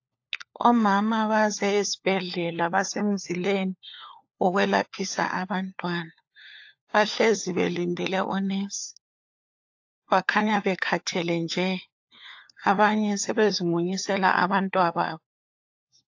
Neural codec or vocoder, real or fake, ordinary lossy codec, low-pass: codec, 16 kHz, 4 kbps, FunCodec, trained on LibriTTS, 50 frames a second; fake; AAC, 48 kbps; 7.2 kHz